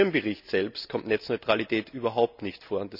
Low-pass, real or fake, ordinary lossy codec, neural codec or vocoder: 5.4 kHz; real; none; none